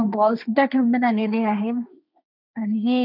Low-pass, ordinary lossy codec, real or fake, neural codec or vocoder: 5.4 kHz; none; fake; codec, 16 kHz, 1.1 kbps, Voila-Tokenizer